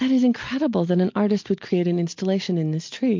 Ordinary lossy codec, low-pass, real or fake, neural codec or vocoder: MP3, 48 kbps; 7.2 kHz; real; none